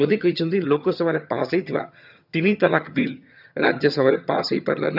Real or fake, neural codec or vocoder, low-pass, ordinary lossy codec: fake; vocoder, 22.05 kHz, 80 mel bands, HiFi-GAN; 5.4 kHz; none